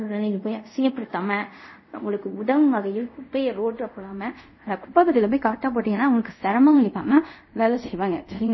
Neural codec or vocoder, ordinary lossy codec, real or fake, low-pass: codec, 24 kHz, 0.5 kbps, DualCodec; MP3, 24 kbps; fake; 7.2 kHz